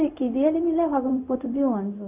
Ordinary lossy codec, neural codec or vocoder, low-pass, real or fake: none; codec, 16 kHz, 0.4 kbps, LongCat-Audio-Codec; 3.6 kHz; fake